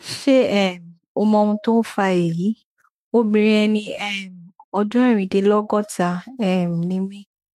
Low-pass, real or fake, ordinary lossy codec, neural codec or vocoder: 19.8 kHz; fake; MP3, 64 kbps; autoencoder, 48 kHz, 32 numbers a frame, DAC-VAE, trained on Japanese speech